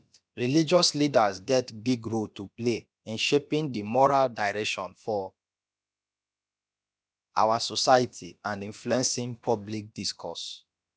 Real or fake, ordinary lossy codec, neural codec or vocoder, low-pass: fake; none; codec, 16 kHz, about 1 kbps, DyCAST, with the encoder's durations; none